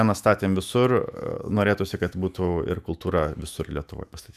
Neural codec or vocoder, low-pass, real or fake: autoencoder, 48 kHz, 128 numbers a frame, DAC-VAE, trained on Japanese speech; 14.4 kHz; fake